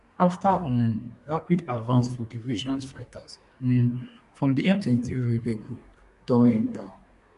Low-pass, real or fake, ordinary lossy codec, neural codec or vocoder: 10.8 kHz; fake; none; codec, 24 kHz, 1 kbps, SNAC